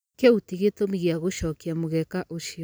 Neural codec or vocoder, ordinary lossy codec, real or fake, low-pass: none; none; real; none